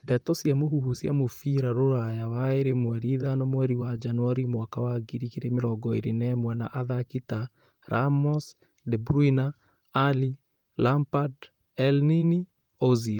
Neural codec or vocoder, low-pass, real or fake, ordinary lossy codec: vocoder, 44.1 kHz, 128 mel bands, Pupu-Vocoder; 19.8 kHz; fake; Opus, 32 kbps